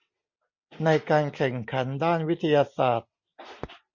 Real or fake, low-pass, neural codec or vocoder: real; 7.2 kHz; none